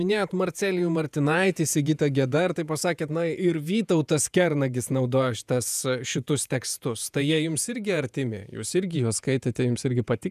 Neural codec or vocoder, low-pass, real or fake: vocoder, 48 kHz, 128 mel bands, Vocos; 14.4 kHz; fake